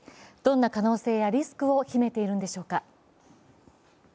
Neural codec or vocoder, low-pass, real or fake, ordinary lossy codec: none; none; real; none